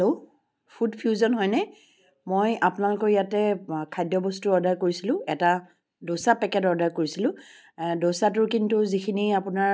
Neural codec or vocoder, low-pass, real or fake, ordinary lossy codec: none; none; real; none